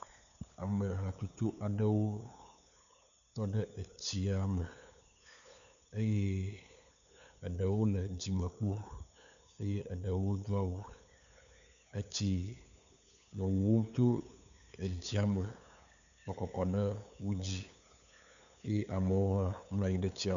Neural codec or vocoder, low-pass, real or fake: codec, 16 kHz, 8 kbps, FunCodec, trained on LibriTTS, 25 frames a second; 7.2 kHz; fake